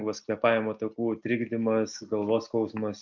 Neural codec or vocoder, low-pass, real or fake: none; 7.2 kHz; real